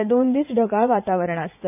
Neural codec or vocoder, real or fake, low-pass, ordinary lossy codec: vocoder, 44.1 kHz, 80 mel bands, Vocos; fake; 3.6 kHz; none